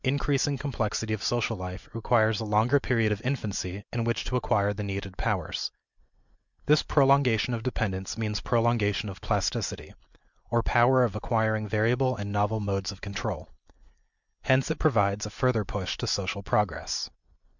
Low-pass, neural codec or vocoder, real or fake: 7.2 kHz; none; real